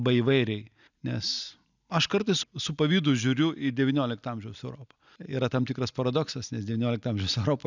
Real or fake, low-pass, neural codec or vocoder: real; 7.2 kHz; none